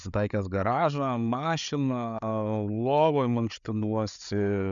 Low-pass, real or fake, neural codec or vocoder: 7.2 kHz; fake; codec, 16 kHz, 8 kbps, FreqCodec, larger model